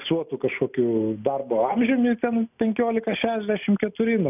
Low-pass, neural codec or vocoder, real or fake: 3.6 kHz; none; real